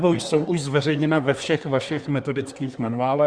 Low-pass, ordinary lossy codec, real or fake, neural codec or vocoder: 9.9 kHz; Opus, 64 kbps; fake; codec, 24 kHz, 1 kbps, SNAC